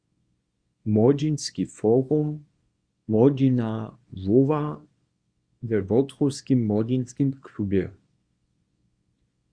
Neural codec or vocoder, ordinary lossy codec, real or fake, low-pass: codec, 24 kHz, 0.9 kbps, WavTokenizer, small release; Opus, 64 kbps; fake; 9.9 kHz